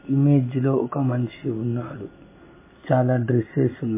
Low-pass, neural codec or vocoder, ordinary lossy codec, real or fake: 3.6 kHz; none; MP3, 16 kbps; real